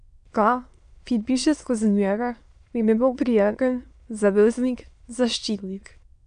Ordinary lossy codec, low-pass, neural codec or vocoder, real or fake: none; 9.9 kHz; autoencoder, 22.05 kHz, a latent of 192 numbers a frame, VITS, trained on many speakers; fake